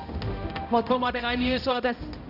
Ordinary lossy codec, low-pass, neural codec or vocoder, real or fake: none; 5.4 kHz; codec, 16 kHz, 0.5 kbps, X-Codec, HuBERT features, trained on balanced general audio; fake